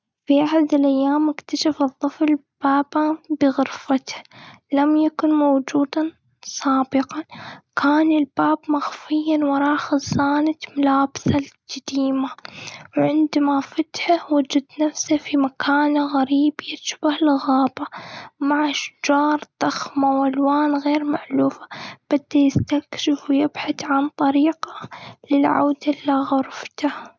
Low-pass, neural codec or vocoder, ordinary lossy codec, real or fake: none; none; none; real